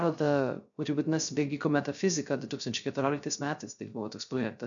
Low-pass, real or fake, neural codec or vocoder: 7.2 kHz; fake; codec, 16 kHz, 0.3 kbps, FocalCodec